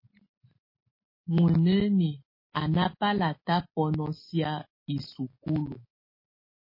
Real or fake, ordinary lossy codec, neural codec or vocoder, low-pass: real; MP3, 24 kbps; none; 5.4 kHz